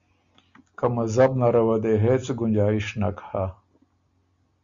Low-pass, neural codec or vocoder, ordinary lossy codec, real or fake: 7.2 kHz; none; Opus, 64 kbps; real